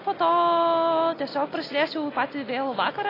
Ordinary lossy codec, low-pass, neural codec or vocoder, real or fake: AAC, 24 kbps; 5.4 kHz; none; real